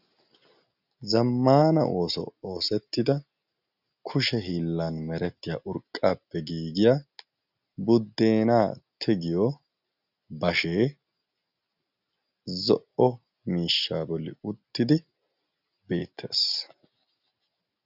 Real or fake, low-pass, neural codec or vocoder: real; 5.4 kHz; none